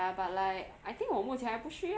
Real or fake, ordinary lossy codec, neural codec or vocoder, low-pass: real; none; none; none